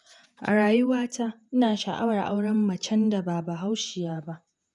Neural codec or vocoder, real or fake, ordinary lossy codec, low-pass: vocoder, 48 kHz, 128 mel bands, Vocos; fake; none; 10.8 kHz